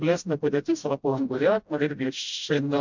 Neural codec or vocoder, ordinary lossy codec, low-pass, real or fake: codec, 16 kHz, 0.5 kbps, FreqCodec, smaller model; MP3, 64 kbps; 7.2 kHz; fake